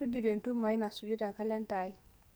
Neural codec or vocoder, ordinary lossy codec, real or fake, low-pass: codec, 44.1 kHz, 2.6 kbps, SNAC; none; fake; none